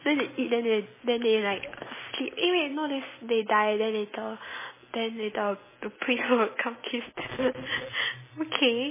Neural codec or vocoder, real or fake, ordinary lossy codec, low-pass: none; real; MP3, 16 kbps; 3.6 kHz